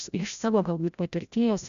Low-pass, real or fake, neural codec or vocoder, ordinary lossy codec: 7.2 kHz; fake; codec, 16 kHz, 0.5 kbps, FreqCodec, larger model; AAC, 96 kbps